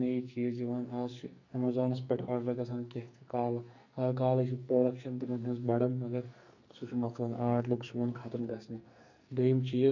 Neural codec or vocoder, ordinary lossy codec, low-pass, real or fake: codec, 44.1 kHz, 2.6 kbps, SNAC; none; 7.2 kHz; fake